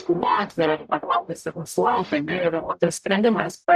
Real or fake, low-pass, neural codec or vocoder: fake; 14.4 kHz; codec, 44.1 kHz, 0.9 kbps, DAC